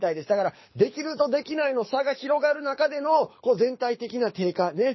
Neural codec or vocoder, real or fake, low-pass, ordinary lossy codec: codec, 24 kHz, 6 kbps, HILCodec; fake; 7.2 kHz; MP3, 24 kbps